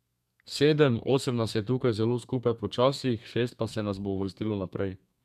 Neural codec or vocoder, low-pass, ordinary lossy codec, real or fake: codec, 32 kHz, 1.9 kbps, SNAC; 14.4 kHz; none; fake